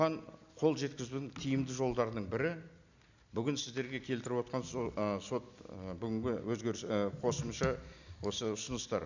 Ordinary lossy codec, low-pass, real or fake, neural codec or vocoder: none; 7.2 kHz; real; none